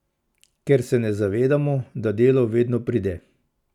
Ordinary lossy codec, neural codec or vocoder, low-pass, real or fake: none; none; 19.8 kHz; real